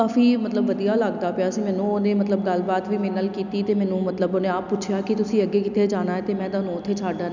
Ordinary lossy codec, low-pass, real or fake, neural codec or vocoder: none; 7.2 kHz; real; none